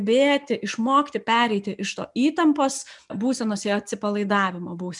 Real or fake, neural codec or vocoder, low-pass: real; none; 10.8 kHz